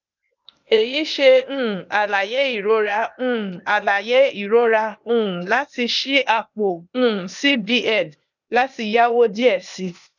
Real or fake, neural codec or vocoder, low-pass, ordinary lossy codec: fake; codec, 16 kHz, 0.8 kbps, ZipCodec; 7.2 kHz; none